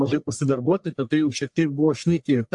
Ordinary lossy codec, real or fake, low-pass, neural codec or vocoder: AAC, 64 kbps; fake; 10.8 kHz; codec, 44.1 kHz, 1.7 kbps, Pupu-Codec